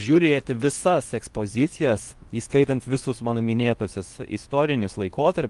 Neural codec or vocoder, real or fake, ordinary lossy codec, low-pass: codec, 16 kHz in and 24 kHz out, 0.8 kbps, FocalCodec, streaming, 65536 codes; fake; Opus, 32 kbps; 10.8 kHz